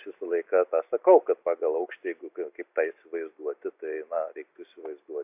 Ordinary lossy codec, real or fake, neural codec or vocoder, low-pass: AAC, 32 kbps; real; none; 3.6 kHz